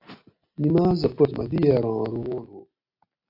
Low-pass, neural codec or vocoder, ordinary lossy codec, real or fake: 5.4 kHz; none; AAC, 32 kbps; real